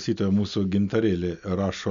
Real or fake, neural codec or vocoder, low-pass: real; none; 7.2 kHz